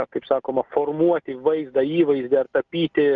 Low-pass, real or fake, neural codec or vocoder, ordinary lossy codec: 7.2 kHz; real; none; Opus, 32 kbps